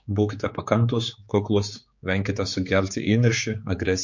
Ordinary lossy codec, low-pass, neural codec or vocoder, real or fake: MP3, 48 kbps; 7.2 kHz; codec, 16 kHz, 4 kbps, X-Codec, HuBERT features, trained on general audio; fake